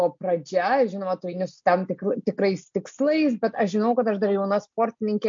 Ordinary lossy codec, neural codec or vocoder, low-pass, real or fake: MP3, 48 kbps; none; 7.2 kHz; real